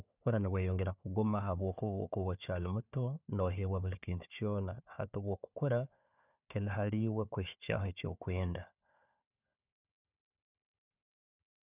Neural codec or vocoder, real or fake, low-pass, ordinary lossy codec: codec, 16 kHz, 4 kbps, FunCodec, trained on Chinese and English, 50 frames a second; fake; 3.6 kHz; none